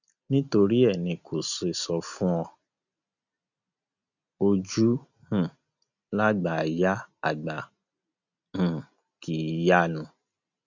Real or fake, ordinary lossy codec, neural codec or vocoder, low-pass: real; none; none; 7.2 kHz